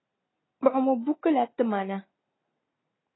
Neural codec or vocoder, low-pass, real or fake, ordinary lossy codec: none; 7.2 kHz; real; AAC, 16 kbps